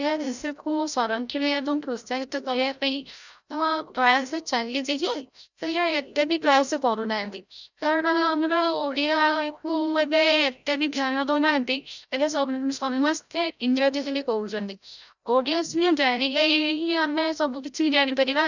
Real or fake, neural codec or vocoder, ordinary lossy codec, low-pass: fake; codec, 16 kHz, 0.5 kbps, FreqCodec, larger model; none; 7.2 kHz